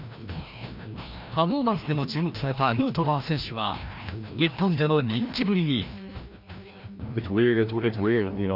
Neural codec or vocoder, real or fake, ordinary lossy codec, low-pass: codec, 16 kHz, 1 kbps, FreqCodec, larger model; fake; none; 5.4 kHz